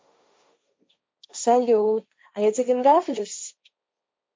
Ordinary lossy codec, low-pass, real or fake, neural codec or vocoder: none; none; fake; codec, 16 kHz, 1.1 kbps, Voila-Tokenizer